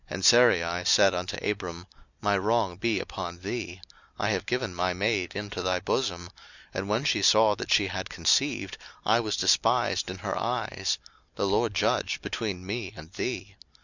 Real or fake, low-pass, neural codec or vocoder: real; 7.2 kHz; none